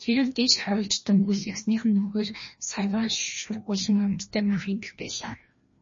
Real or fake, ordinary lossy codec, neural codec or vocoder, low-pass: fake; MP3, 32 kbps; codec, 16 kHz, 1 kbps, FreqCodec, larger model; 7.2 kHz